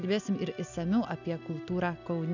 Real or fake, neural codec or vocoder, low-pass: real; none; 7.2 kHz